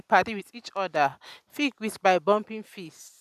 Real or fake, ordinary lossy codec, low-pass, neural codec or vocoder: real; none; 14.4 kHz; none